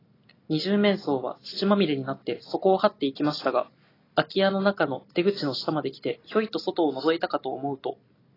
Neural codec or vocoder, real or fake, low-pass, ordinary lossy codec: none; real; 5.4 kHz; AAC, 24 kbps